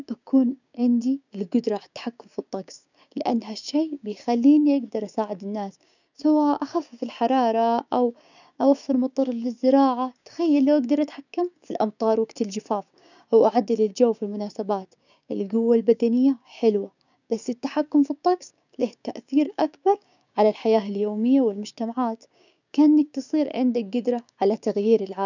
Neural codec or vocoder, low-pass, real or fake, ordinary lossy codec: codec, 16 kHz, 6 kbps, DAC; 7.2 kHz; fake; none